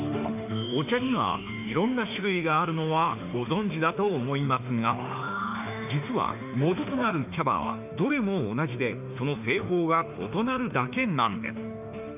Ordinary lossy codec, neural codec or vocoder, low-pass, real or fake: none; autoencoder, 48 kHz, 32 numbers a frame, DAC-VAE, trained on Japanese speech; 3.6 kHz; fake